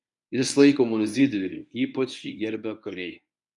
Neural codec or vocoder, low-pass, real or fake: codec, 24 kHz, 0.9 kbps, WavTokenizer, medium speech release version 1; 10.8 kHz; fake